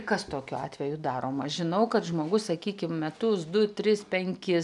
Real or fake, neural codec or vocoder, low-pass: real; none; 10.8 kHz